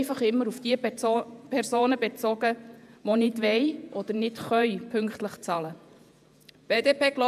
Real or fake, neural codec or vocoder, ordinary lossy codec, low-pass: fake; vocoder, 48 kHz, 128 mel bands, Vocos; AAC, 96 kbps; 14.4 kHz